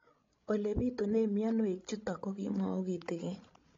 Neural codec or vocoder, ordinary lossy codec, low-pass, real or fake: codec, 16 kHz, 16 kbps, FreqCodec, larger model; AAC, 32 kbps; 7.2 kHz; fake